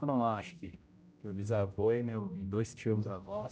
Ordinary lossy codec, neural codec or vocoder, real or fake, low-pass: none; codec, 16 kHz, 0.5 kbps, X-Codec, HuBERT features, trained on general audio; fake; none